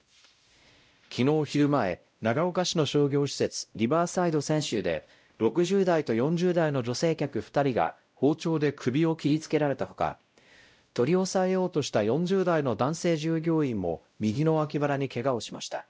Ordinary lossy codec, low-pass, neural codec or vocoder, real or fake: none; none; codec, 16 kHz, 0.5 kbps, X-Codec, WavLM features, trained on Multilingual LibriSpeech; fake